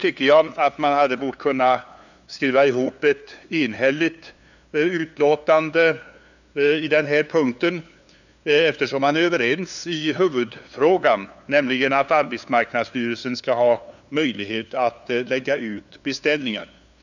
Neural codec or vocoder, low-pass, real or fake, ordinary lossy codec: codec, 16 kHz, 2 kbps, FunCodec, trained on LibriTTS, 25 frames a second; 7.2 kHz; fake; none